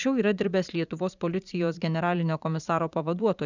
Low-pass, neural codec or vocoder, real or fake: 7.2 kHz; vocoder, 44.1 kHz, 80 mel bands, Vocos; fake